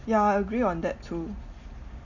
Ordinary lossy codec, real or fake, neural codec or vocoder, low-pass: none; real; none; 7.2 kHz